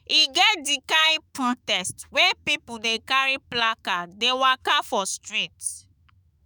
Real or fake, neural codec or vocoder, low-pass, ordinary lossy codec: fake; autoencoder, 48 kHz, 128 numbers a frame, DAC-VAE, trained on Japanese speech; none; none